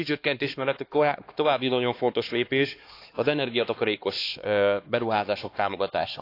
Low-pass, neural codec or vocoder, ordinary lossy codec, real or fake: 5.4 kHz; codec, 16 kHz, 2 kbps, X-Codec, HuBERT features, trained on LibriSpeech; AAC, 32 kbps; fake